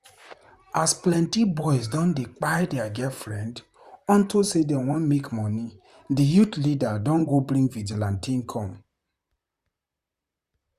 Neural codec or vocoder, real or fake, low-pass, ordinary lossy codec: vocoder, 44.1 kHz, 128 mel bands, Pupu-Vocoder; fake; 14.4 kHz; Opus, 64 kbps